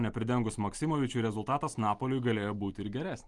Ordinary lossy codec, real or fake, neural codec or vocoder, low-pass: Opus, 32 kbps; real; none; 10.8 kHz